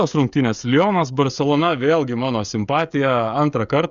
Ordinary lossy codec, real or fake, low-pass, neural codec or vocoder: Opus, 64 kbps; fake; 7.2 kHz; codec, 16 kHz, 16 kbps, FreqCodec, smaller model